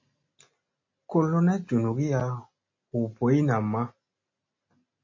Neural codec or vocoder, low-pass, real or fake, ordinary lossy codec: none; 7.2 kHz; real; MP3, 32 kbps